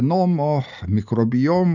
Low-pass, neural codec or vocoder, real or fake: 7.2 kHz; none; real